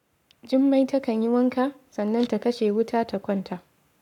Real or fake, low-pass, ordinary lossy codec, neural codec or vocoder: fake; 19.8 kHz; none; codec, 44.1 kHz, 7.8 kbps, Pupu-Codec